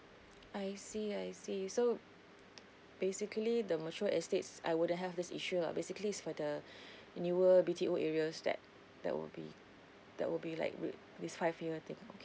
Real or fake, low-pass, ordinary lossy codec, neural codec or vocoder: real; none; none; none